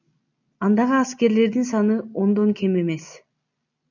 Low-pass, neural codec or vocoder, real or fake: 7.2 kHz; none; real